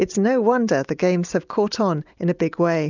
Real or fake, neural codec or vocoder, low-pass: real; none; 7.2 kHz